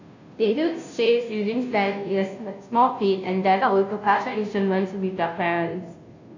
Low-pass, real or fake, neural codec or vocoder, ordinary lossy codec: 7.2 kHz; fake; codec, 16 kHz, 0.5 kbps, FunCodec, trained on Chinese and English, 25 frames a second; AAC, 48 kbps